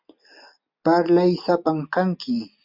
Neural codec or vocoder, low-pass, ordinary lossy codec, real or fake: none; 5.4 kHz; MP3, 48 kbps; real